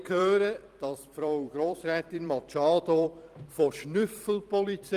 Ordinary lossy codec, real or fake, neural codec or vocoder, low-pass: Opus, 24 kbps; fake; vocoder, 44.1 kHz, 128 mel bands every 256 samples, BigVGAN v2; 14.4 kHz